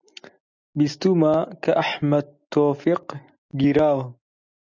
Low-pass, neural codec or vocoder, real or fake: 7.2 kHz; none; real